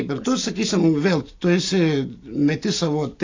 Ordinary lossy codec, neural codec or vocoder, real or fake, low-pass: AAC, 32 kbps; none; real; 7.2 kHz